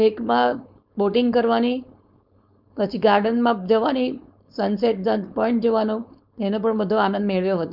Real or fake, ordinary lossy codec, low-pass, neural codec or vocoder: fake; none; 5.4 kHz; codec, 16 kHz, 4.8 kbps, FACodec